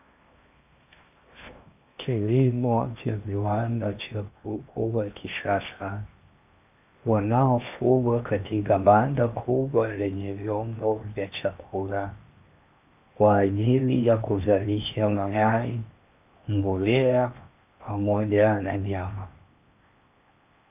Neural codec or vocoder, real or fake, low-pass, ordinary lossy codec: codec, 16 kHz in and 24 kHz out, 0.8 kbps, FocalCodec, streaming, 65536 codes; fake; 3.6 kHz; AAC, 32 kbps